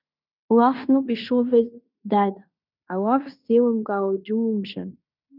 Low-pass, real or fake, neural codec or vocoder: 5.4 kHz; fake; codec, 16 kHz in and 24 kHz out, 0.9 kbps, LongCat-Audio-Codec, fine tuned four codebook decoder